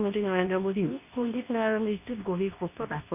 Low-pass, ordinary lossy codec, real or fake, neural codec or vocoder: 3.6 kHz; none; fake; codec, 24 kHz, 0.9 kbps, WavTokenizer, medium speech release version 2